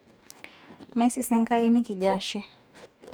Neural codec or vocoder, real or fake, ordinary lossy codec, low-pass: codec, 44.1 kHz, 2.6 kbps, DAC; fake; none; none